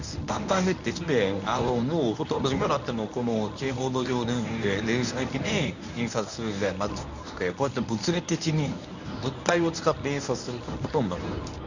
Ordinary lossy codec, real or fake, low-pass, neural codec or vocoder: none; fake; 7.2 kHz; codec, 24 kHz, 0.9 kbps, WavTokenizer, medium speech release version 1